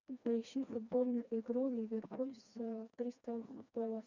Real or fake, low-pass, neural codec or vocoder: fake; 7.2 kHz; codec, 16 kHz, 2 kbps, FreqCodec, smaller model